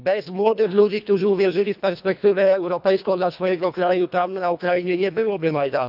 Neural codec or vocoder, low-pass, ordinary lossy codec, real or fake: codec, 24 kHz, 1.5 kbps, HILCodec; 5.4 kHz; none; fake